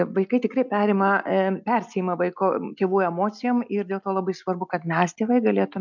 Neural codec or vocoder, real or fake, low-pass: none; real; 7.2 kHz